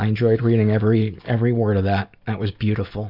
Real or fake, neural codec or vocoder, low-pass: real; none; 5.4 kHz